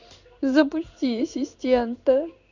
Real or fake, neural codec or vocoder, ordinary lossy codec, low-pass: fake; codec, 16 kHz in and 24 kHz out, 1 kbps, XY-Tokenizer; none; 7.2 kHz